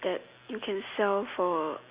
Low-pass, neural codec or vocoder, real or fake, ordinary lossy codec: 3.6 kHz; none; real; Opus, 64 kbps